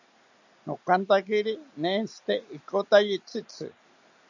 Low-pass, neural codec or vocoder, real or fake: 7.2 kHz; none; real